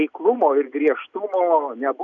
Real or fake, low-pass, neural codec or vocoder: real; 7.2 kHz; none